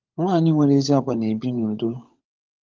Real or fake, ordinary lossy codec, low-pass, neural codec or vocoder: fake; Opus, 32 kbps; 7.2 kHz; codec, 16 kHz, 16 kbps, FunCodec, trained on LibriTTS, 50 frames a second